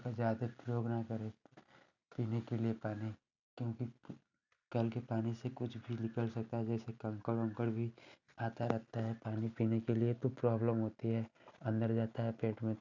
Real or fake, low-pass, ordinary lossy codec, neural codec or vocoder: real; 7.2 kHz; none; none